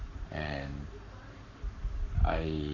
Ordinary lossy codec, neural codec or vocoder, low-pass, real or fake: none; codec, 44.1 kHz, 7.8 kbps, Pupu-Codec; 7.2 kHz; fake